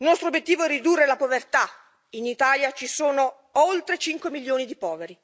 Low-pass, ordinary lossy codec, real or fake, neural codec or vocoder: none; none; real; none